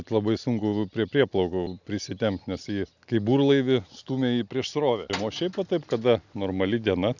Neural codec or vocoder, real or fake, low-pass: none; real; 7.2 kHz